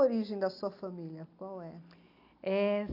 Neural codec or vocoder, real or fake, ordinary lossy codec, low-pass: none; real; none; 5.4 kHz